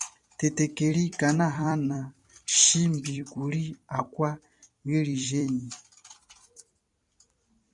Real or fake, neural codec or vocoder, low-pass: fake; vocoder, 44.1 kHz, 128 mel bands every 256 samples, BigVGAN v2; 10.8 kHz